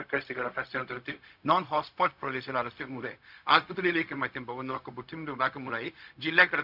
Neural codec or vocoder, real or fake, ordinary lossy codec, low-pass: codec, 16 kHz, 0.4 kbps, LongCat-Audio-Codec; fake; none; 5.4 kHz